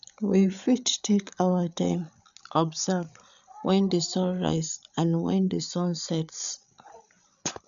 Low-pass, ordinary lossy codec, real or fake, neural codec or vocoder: 7.2 kHz; none; fake; codec, 16 kHz, 8 kbps, FreqCodec, larger model